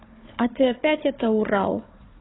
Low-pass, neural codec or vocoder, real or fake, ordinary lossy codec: 7.2 kHz; codec, 16 kHz, 4 kbps, FunCodec, trained on Chinese and English, 50 frames a second; fake; AAC, 16 kbps